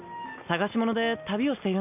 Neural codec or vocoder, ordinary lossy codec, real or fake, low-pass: none; none; real; 3.6 kHz